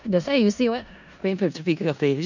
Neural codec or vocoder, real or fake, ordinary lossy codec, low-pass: codec, 16 kHz in and 24 kHz out, 0.4 kbps, LongCat-Audio-Codec, four codebook decoder; fake; none; 7.2 kHz